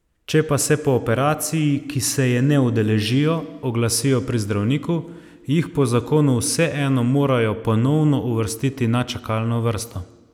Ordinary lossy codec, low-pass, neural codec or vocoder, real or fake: none; 19.8 kHz; none; real